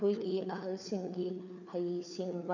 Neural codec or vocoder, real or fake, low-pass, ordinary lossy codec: codec, 16 kHz, 4 kbps, FunCodec, trained on LibriTTS, 50 frames a second; fake; 7.2 kHz; none